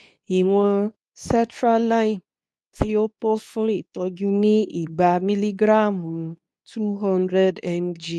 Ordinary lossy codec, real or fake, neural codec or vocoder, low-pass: none; fake; codec, 24 kHz, 0.9 kbps, WavTokenizer, medium speech release version 2; none